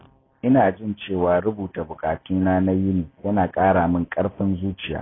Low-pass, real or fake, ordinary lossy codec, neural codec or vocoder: 7.2 kHz; real; AAC, 16 kbps; none